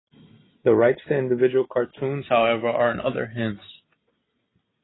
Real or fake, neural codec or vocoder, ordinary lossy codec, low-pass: real; none; AAC, 16 kbps; 7.2 kHz